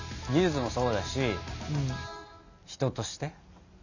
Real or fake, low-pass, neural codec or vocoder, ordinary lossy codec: real; 7.2 kHz; none; none